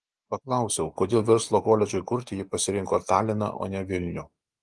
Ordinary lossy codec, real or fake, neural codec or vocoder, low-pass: Opus, 24 kbps; real; none; 10.8 kHz